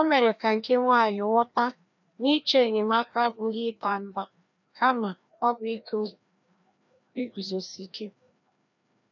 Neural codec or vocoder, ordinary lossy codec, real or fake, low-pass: codec, 16 kHz, 1 kbps, FreqCodec, larger model; none; fake; 7.2 kHz